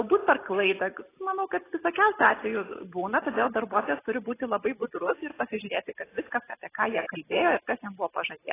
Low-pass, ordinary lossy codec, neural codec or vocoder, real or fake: 3.6 kHz; AAC, 16 kbps; none; real